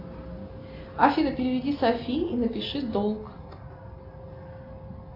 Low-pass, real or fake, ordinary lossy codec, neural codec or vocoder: 5.4 kHz; real; AAC, 32 kbps; none